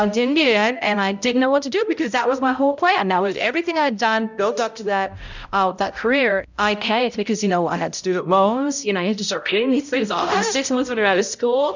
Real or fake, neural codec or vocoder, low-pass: fake; codec, 16 kHz, 0.5 kbps, X-Codec, HuBERT features, trained on balanced general audio; 7.2 kHz